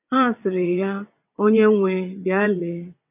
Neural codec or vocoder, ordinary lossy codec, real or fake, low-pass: vocoder, 22.05 kHz, 80 mel bands, WaveNeXt; none; fake; 3.6 kHz